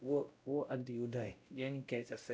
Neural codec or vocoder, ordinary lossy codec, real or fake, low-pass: codec, 16 kHz, 0.5 kbps, X-Codec, WavLM features, trained on Multilingual LibriSpeech; none; fake; none